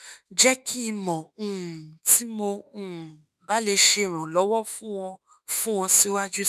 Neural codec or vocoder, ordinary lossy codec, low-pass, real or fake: autoencoder, 48 kHz, 32 numbers a frame, DAC-VAE, trained on Japanese speech; none; 14.4 kHz; fake